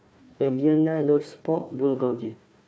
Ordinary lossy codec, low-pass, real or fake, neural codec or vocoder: none; none; fake; codec, 16 kHz, 1 kbps, FunCodec, trained on Chinese and English, 50 frames a second